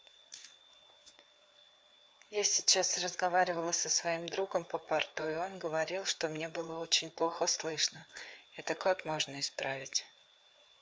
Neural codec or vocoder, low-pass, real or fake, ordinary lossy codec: codec, 16 kHz, 4 kbps, FreqCodec, larger model; none; fake; none